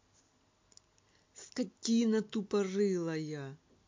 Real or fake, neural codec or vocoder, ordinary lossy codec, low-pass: real; none; MP3, 48 kbps; 7.2 kHz